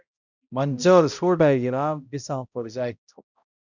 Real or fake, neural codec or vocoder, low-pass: fake; codec, 16 kHz, 0.5 kbps, X-Codec, HuBERT features, trained on balanced general audio; 7.2 kHz